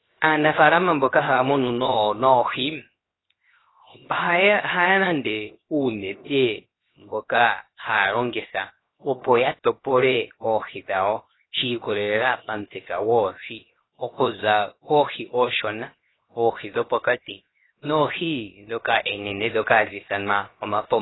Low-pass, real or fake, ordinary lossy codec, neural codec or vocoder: 7.2 kHz; fake; AAC, 16 kbps; codec, 16 kHz, 0.7 kbps, FocalCodec